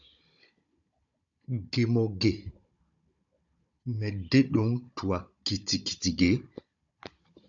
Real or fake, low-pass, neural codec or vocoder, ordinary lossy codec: fake; 7.2 kHz; codec, 16 kHz, 16 kbps, FunCodec, trained on Chinese and English, 50 frames a second; AAC, 64 kbps